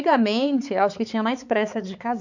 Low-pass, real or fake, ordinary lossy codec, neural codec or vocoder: 7.2 kHz; fake; none; codec, 16 kHz, 4 kbps, X-Codec, HuBERT features, trained on balanced general audio